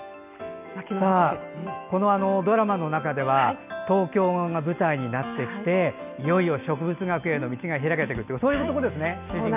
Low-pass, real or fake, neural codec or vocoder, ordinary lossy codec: 3.6 kHz; real; none; none